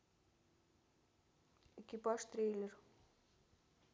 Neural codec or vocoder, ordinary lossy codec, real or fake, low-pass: none; none; real; none